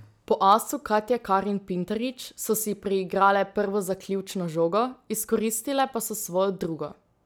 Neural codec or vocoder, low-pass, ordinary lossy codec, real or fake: none; none; none; real